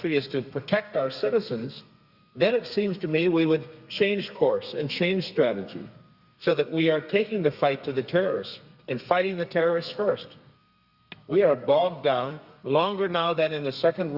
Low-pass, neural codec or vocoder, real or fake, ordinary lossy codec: 5.4 kHz; codec, 32 kHz, 1.9 kbps, SNAC; fake; Opus, 64 kbps